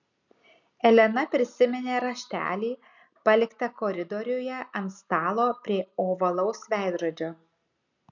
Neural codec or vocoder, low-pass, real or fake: none; 7.2 kHz; real